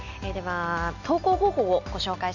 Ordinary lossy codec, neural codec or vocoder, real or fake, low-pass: none; none; real; 7.2 kHz